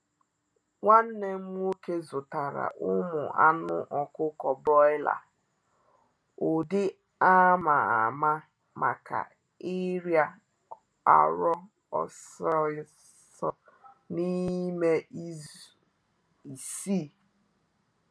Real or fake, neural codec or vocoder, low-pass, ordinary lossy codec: real; none; none; none